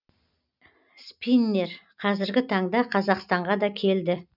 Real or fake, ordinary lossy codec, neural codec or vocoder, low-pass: real; none; none; 5.4 kHz